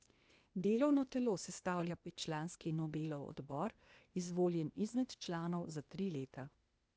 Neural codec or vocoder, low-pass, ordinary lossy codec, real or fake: codec, 16 kHz, 0.8 kbps, ZipCodec; none; none; fake